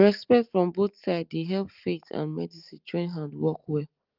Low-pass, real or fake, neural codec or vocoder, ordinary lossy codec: 5.4 kHz; real; none; Opus, 32 kbps